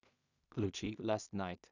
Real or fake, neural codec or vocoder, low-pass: fake; codec, 16 kHz in and 24 kHz out, 0.4 kbps, LongCat-Audio-Codec, two codebook decoder; 7.2 kHz